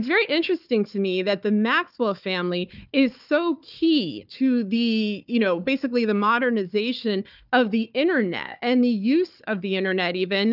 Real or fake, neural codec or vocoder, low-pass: fake; codec, 16 kHz, 4 kbps, FunCodec, trained on Chinese and English, 50 frames a second; 5.4 kHz